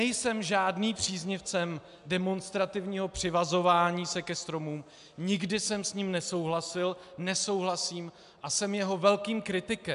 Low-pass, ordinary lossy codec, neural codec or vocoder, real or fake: 10.8 kHz; AAC, 96 kbps; none; real